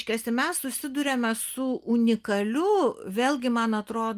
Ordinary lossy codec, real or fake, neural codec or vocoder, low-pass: Opus, 32 kbps; real; none; 14.4 kHz